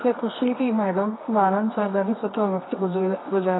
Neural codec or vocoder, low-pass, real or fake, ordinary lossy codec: codec, 24 kHz, 0.9 kbps, WavTokenizer, medium music audio release; 7.2 kHz; fake; AAC, 16 kbps